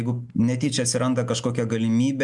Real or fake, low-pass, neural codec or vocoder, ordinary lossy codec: real; 10.8 kHz; none; MP3, 96 kbps